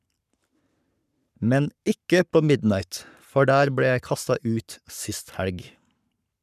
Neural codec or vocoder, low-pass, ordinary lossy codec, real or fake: codec, 44.1 kHz, 7.8 kbps, Pupu-Codec; 14.4 kHz; none; fake